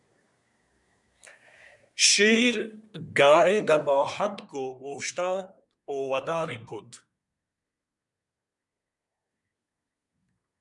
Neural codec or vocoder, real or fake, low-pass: codec, 24 kHz, 1 kbps, SNAC; fake; 10.8 kHz